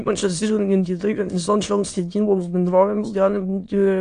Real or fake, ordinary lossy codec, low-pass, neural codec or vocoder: fake; AAC, 48 kbps; 9.9 kHz; autoencoder, 22.05 kHz, a latent of 192 numbers a frame, VITS, trained on many speakers